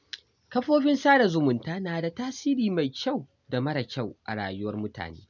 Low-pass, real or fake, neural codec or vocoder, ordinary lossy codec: 7.2 kHz; real; none; none